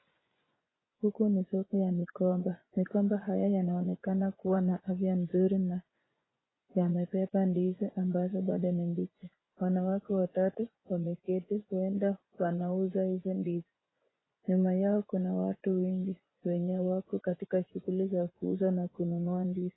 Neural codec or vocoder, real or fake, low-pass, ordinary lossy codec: vocoder, 24 kHz, 100 mel bands, Vocos; fake; 7.2 kHz; AAC, 16 kbps